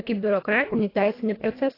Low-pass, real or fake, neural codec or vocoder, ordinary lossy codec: 5.4 kHz; fake; codec, 24 kHz, 1.5 kbps, HILCodec; AAC, 24 kbps